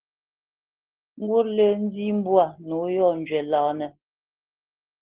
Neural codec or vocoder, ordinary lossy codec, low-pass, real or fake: none; Opus, 16 kbps; 3.6 kHz; real